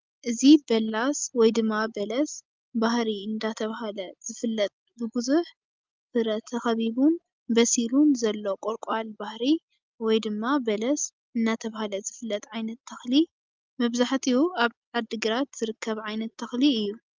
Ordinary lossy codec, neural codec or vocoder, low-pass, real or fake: Opus, 24 kbps; none; 7.2 kHz; real